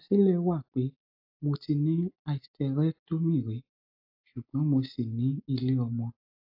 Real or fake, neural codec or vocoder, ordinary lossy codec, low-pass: real; none; AAC, 48 kbps; 5.4 kHz